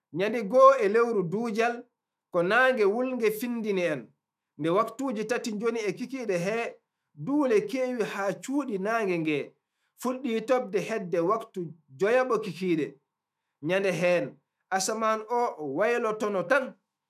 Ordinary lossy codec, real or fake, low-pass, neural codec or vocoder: none; fake; 14.4 kHz; autoencoder, 48 kHz, 128 numbers a frame, DAC-VAE, trained on Japanese speech